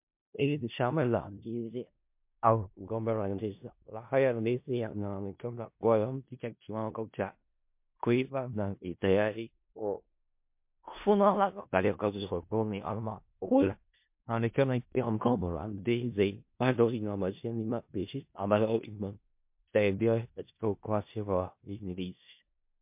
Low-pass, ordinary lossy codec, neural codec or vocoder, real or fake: 3.6 kHz; MP3, 32 kbps; codec, 16 kHz in and 24 kHz out, 0.4 kbps, LongCat-Audio-Codec, four codebook decoder; fake